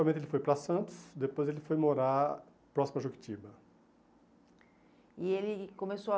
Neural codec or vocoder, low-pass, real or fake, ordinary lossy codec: none; none; real; none